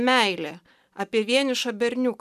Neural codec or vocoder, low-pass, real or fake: none; 14.4 kHz; real